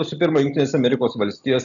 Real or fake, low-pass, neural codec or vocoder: real; 7.2 kHz; none